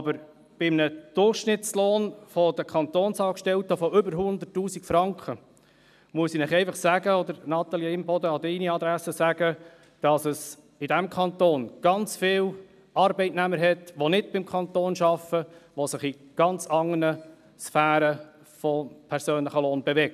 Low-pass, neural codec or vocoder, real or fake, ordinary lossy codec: 14.4 kHz; none; real; none